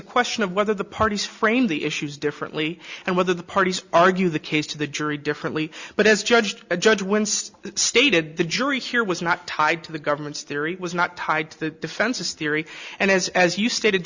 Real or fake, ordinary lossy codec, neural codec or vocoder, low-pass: real; Opus, 64 kbps; none; 7.2 kHz